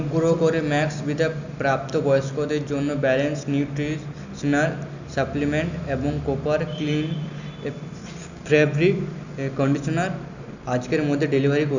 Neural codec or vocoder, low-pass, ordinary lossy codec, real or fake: none; 7.2 kHz; none; real